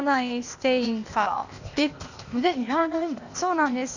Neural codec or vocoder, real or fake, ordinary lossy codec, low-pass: codec, 16 kHz, 0.8 kbps, ZipCodec; fake; none; 7.2 kHz